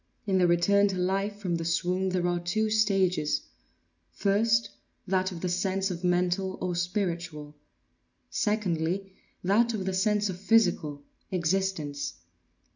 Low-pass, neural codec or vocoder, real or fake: 7.2 kHz; none; real